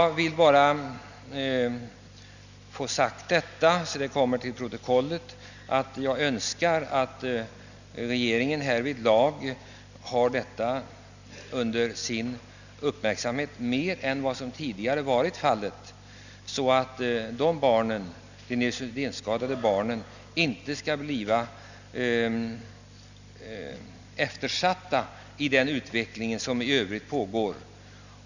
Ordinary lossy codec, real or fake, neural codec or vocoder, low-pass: none; real; none; 7.2 kHz